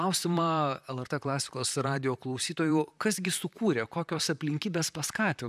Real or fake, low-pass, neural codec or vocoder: fake; 14.4 kHz; vocoder, 44.1 kHz, 128 mel bands, Pupu-Vocoder